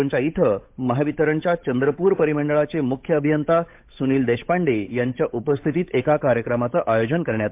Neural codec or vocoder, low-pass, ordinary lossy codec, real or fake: codec, 16 kHz, 8 kbps, FunCodec, trained on Chinese and English, 25 frames a second; 3.6 kHz; MP3, 32 kbps; fake